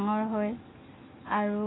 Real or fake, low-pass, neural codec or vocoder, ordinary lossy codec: real; 7.2 kHz; none; AAC, 16 kbps